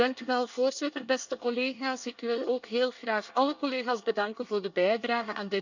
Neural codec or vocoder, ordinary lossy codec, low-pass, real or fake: codec, 24 kHz, 1 kbps, SNAC; none; 7.2 kHz; fake